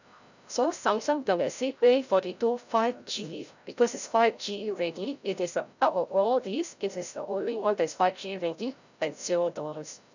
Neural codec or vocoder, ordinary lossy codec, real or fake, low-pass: codec, 16 kHz, 0.5 kbps, FreqCodec, larger model; none; fake; 7.2 kHz